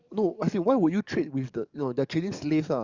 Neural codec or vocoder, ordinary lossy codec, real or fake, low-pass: codec, 44.1 kHz, 7.8 kbps, DAC; Opus, 64 kbps; fake; 7.2 kHz